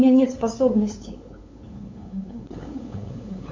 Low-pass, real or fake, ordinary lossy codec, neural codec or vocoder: 7.2 kHz; fake; MP3, 64 kbps; codec, 16 kHz, 8 kbps, FunCodec, trained on LibriTTS, 25 frames a second